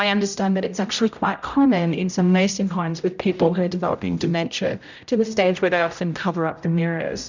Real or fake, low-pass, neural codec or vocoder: fake; 7.2 kHz; codec, 16 kHz, 0.5 kbps, X-Codec, HuBERT features, trained on general audio